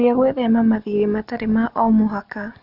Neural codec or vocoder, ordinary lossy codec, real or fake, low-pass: none; none; real; 5.4 kHz